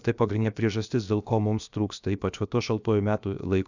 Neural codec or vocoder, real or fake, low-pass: codec, 16 kHz, 0.7 kbps, FocalCodec; fake; 7.2 kHz